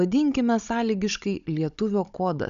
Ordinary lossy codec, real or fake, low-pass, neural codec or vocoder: AAC, 96 kbps; fake; 7.2 kHz; codec, 16 kHz, 16 kbps, FunCodec, trained on Chinese and English, 50 frames a second